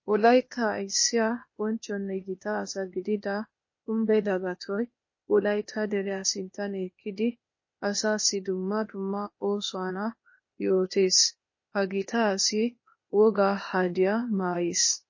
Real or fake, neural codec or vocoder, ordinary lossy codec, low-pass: fake; codec, 16 kHz, 0.8 kbps, ZipCodec; MP3, 32 kbps; 7.2 kHz